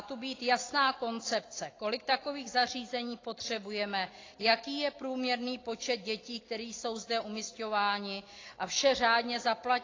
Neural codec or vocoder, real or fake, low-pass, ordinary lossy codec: none; real; 7.2 kHz; AAC, 32 kbps